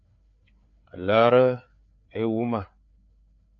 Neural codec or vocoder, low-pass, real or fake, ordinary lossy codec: codec, 16 kHz, 4 kbps, FreqCodec, larger model; 7.2 kHz; fake; MP3, 48 kbps